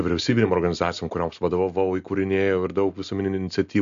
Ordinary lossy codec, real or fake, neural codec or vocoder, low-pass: MP3, 64 kbps; real; none; 7.2 kHz